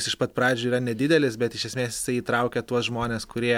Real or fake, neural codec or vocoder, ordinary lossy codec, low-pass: real; none; MP3, 96 kbps; 19.8 kHz